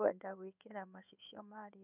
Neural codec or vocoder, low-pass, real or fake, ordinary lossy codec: codec, 16 kHz in and 24 kHz out, 1 kbps, XY-Tokenizer; 3.6 kHz; fake; none